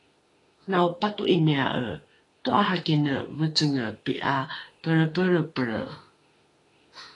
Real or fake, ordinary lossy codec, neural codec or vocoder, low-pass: fake; AAC, 32 kbps; autoencoder, 48 kHz, 32 numbers a frame, DAC-VAE, trained on Japanese speech; 10.8 kHz